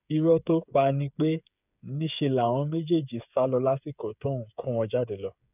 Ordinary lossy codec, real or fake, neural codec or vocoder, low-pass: none; fake; codec, 16 kHz, 8 kbps, FreqCodec, smaller model; 3.6 kHz